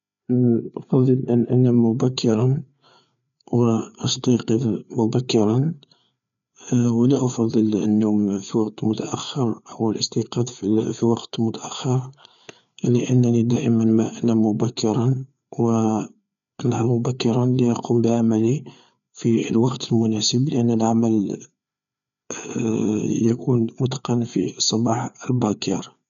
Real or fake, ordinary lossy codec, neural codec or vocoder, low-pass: fake; none; codec, 16 kHz, 4 kbps, FreqCodec, larger model; 7.2 kHz